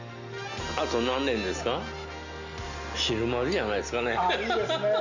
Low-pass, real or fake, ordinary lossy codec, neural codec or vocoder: 7.2 kHz; real; Opus, 64 kbps; none